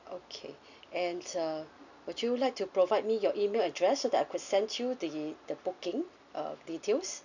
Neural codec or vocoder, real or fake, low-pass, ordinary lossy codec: none; real; 7.2 kHz; none